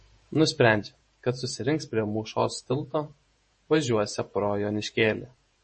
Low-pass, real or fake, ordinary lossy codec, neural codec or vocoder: 10.8 kHz; fake; MP3, 32 kbps; vocoder, 48 kHz, 128 mel bands, Vocos